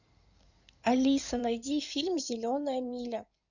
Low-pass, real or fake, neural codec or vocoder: 7.2 kHz; fake; codec, 16 kHz in and 24 kHz out, 2.2 kbps, FireRedTTS-2 codec